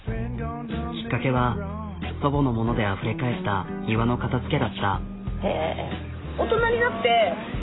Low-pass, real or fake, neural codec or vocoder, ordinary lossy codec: 7.2 kHz; real; none; AAC, 16 kbps